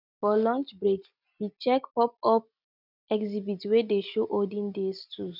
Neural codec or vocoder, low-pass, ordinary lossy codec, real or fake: none; 5.4 kHz; none; real